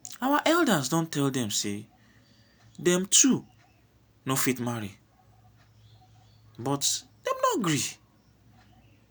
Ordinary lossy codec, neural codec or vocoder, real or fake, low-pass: none; none; real; none